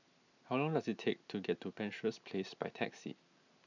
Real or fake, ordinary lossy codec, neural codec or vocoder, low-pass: real; none; none; 7.2 kHz